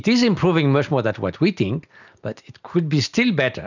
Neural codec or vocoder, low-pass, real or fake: none; 7.2 kHz; real